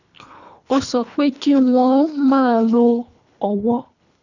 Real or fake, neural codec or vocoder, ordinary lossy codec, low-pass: fake; codec, 24 kHz, 3 kbps, HILCodec; none; 7.2 kHz